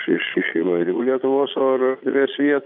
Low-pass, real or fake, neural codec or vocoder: 5.4 kHz; fake; vocoder, 22.05 kHz, 80 mel bands, Vocos